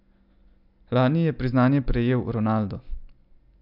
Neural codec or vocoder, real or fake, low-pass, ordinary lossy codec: none; real; 5.4 kHz; none